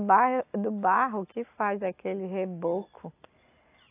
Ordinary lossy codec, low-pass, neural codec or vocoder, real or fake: AAC, 24 kbps; 3.6 kHz; none; real